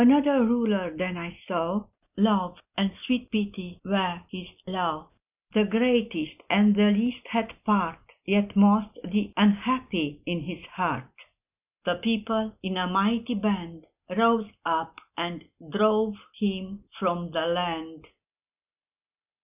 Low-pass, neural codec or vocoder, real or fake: 3.6 kHz; none; real